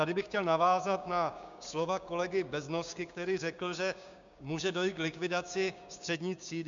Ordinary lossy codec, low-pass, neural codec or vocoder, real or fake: MP3, 64 kbps; 7.2 kHz; codec, 16 kHz, 6 kbps, DAC; fake